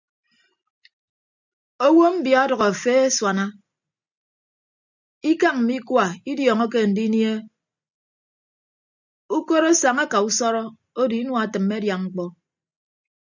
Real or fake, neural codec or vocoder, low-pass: real; none; 7.2 kHz